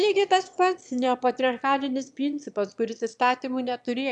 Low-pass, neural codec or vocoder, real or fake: 9.9 kHz; autoencoder, 22.05 kHz, a latent of 192 numbers a frame, VITS, trained on one speaker; fake